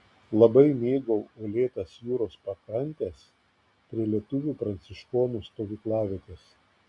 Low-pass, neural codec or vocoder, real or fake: 10.8 kHz; none; real